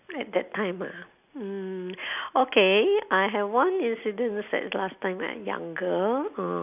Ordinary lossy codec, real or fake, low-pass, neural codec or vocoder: none; real; 3.6 kHz; none